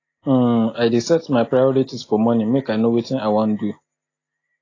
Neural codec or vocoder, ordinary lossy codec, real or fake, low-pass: none; AAC, 32 kbps; real; 7.2 kHz